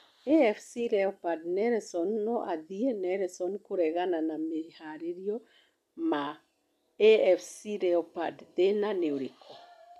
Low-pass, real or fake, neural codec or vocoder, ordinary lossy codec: 14.4 kHz; real; none; none